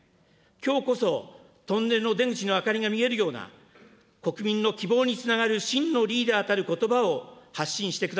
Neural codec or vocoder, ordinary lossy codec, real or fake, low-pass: none; none; real; none